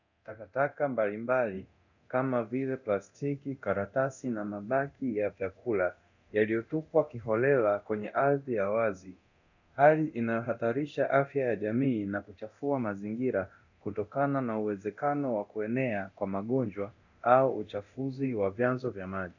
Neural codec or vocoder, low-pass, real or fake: codec, 24 kHz, 0.9 kbps, DualCodec; 7.2 kHz; fake